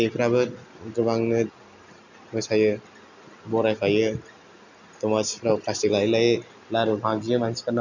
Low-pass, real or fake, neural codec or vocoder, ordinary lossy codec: 7.2 kHz; real; none; none